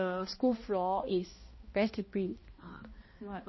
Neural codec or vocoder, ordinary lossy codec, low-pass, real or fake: codec, 16 kHz, 1 kbps, X-Codec, HuBERT features, trained on balanced general audio; MP3, 24 kbps; 7.2 kHz; fake